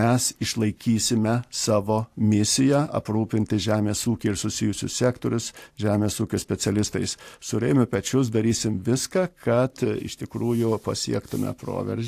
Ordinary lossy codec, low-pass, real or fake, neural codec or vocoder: AAC, 64 kbps; 14.4 kHz; fake; vocoder, 44.1 kHz, 128 mel bands every 512 samples, BigVGAN v2